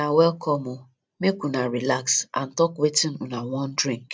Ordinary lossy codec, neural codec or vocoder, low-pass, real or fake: none; none; none; real